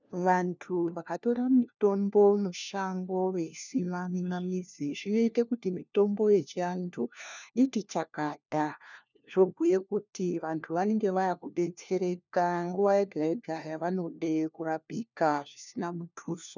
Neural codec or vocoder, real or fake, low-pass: codec, 16 kHz, 1 kbps, FunCodec, trained on LibriTTS, 50 frames a second; fake; 7.2 kHz